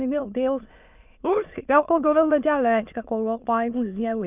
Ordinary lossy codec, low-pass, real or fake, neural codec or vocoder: none; 3.6 kHz; fake; autoencoder, 22.05 kHz, a latent of 192 numbers a frame, VITS, trained on many speakers